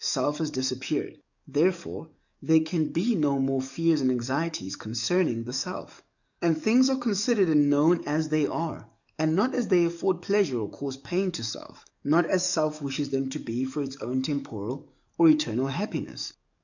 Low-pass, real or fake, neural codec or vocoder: 7.2 kHz; fake; codec, 44.1 kHz, 7.8 kbps, DAC